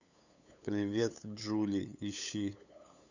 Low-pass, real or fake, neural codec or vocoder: 7.2 kHz; fake; codec, 16 kHz, 8 kbps, FunCodec, trained on LibriTTS, 25 frames a second